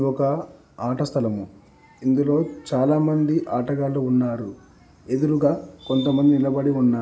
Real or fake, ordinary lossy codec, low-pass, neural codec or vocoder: real; none; none; none